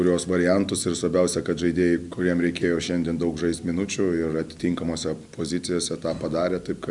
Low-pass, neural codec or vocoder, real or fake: 10.8 kHz; none; real